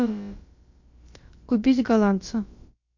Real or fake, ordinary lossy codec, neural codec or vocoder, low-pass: fake; MP3, 48 kbps; codec, 16 kHz, about 1 kbps, DyCAST, with the encoder's durations; 7.2 kHz